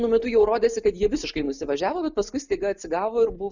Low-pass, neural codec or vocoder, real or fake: 7.2 kHz; none; real